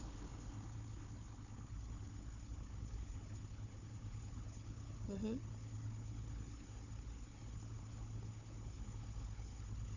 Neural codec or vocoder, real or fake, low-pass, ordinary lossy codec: codec, 16 kHz, 4 kbps, FunCodec, trained on Chinese and English, 50 frames a second; fake; 7.2 kHz; none